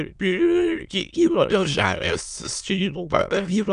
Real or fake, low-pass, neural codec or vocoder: fake; 9.9 kHz; autoencoder, 22.05 kHz, a latent of 192 numbers a frame, VITS, trained on many speakers